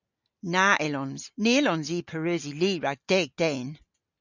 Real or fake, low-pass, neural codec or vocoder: real; 7.2 kHz; none